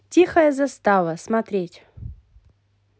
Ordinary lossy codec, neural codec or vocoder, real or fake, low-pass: none; none; real; none